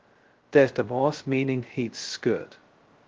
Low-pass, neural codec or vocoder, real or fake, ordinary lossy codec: 7.2 kHz; codec, 16 kHz, 0.2 kbps, FocalCodec; fake; Opus, 16 kbps